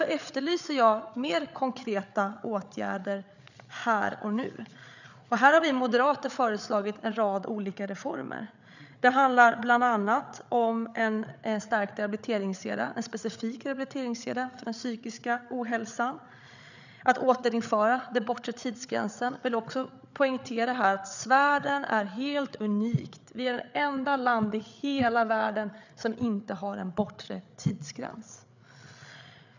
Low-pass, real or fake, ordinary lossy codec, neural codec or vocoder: 7.2 kHz; fake; none; codec, 16 kHz, 8 kbps, FreqCodec, larger model